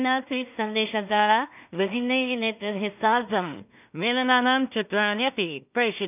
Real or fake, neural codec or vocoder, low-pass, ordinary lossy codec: fake; codec, 16 kHz in and 24 kHz out, 0.4 kbps, LongCat-Audio-Codec, two codebook decoder; 3.6 kHz; none